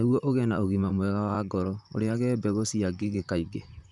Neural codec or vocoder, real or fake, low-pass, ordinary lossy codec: vocoder, 44.1 kHz, 128 mel bands, Pupu-Vocoder; fake; 10.8 kHz; none